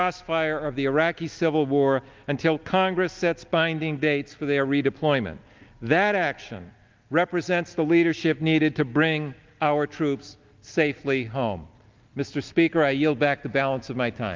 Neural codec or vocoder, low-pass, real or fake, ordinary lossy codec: none; 7.2 kHz; real; Opus, 24 kbps